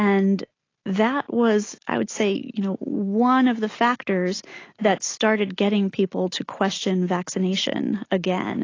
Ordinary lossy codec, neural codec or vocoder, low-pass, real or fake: AAC, 32 kbps; none; 7.2 kHz; real